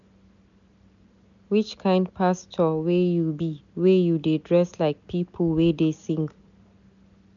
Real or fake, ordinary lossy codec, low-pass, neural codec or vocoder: real; none; 7.2 kHz; none